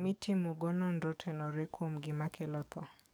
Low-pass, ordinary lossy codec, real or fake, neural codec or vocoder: none; none; fake; codec, 44.1 kHz, 7.8 kbps, DAC